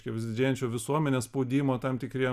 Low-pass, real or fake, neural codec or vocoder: 14.4 kHz; real; none